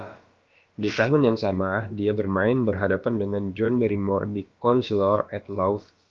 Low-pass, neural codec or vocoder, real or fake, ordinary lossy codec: 7.2 kHz; codec, 16 kHz, about 1 kbps, DyCAST, with the encoder's durations; fake; Opus, 24 kbps